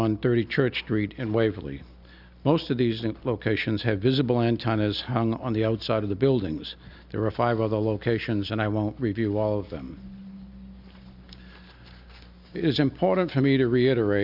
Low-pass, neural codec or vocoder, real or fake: 5.4 kHz; none; real